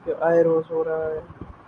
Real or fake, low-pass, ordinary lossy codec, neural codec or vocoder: real; 9.9 kHz; MP3, 48 kbps; none